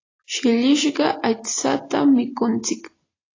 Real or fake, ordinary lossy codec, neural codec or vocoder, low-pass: real; AAC, 32 kbps; none; 7.2 kHz